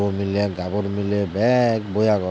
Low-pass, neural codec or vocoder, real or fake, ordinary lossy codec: none; none; real; none